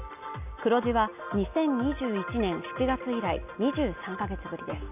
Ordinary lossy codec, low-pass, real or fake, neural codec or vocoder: none; 3.6 kHz; real; none